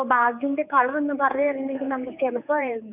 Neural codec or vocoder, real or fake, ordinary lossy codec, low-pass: codec, 16 kHz, 4 kbps, X-Codec, HuBERT features, trained on general audio; fake; none; 3.6 kHz